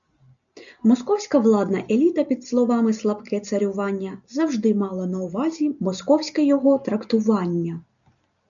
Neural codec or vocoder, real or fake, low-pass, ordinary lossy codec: none; real; 7.2 kHz; MP3, 96 kbps